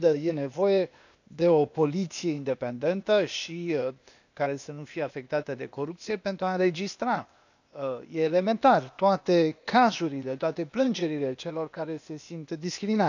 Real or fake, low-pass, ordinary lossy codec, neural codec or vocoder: fake; 7.2 kHz; none; codec, 16 kHz, 0.8 kbps, ZipCodec